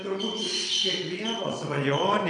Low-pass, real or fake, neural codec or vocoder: 9.9 kHz; fake; vocoder, 22.05 kHz, 80 mel bands, WaveNeXt